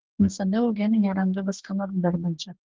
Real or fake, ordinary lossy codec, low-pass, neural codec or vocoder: fake; Opus, 16 kbps; 7.2 kHz; codec, 44.1 kHz, 2.6 kbps, DAC